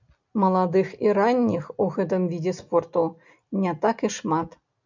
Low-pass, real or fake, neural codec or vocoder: 7.2 kHz; real; none